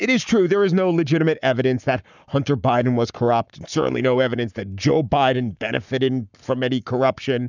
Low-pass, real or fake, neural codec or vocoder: 7.2 kHz; fake; codec, 44.1 kHz, 7.8 kbps, Pupu-Codec